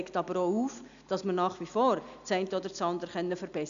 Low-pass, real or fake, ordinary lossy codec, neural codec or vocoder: 7.2 kHz; real; none; none